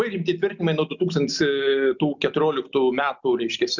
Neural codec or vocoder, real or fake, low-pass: none; real; 7.2 kHz